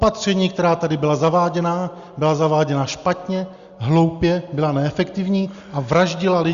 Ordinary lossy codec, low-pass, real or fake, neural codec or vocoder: Opus, 64 kbps; 7.2 kHz; real; none